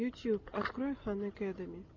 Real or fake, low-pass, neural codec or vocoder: real; 7.2 kHz; none